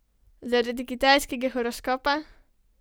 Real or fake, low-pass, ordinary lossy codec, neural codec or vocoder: real; none; none; none